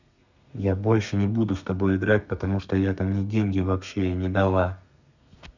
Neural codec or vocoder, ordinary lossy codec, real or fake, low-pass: codec, 32 kHz, 1.9 kbps, SNAC; none; fake; 7.2 kHz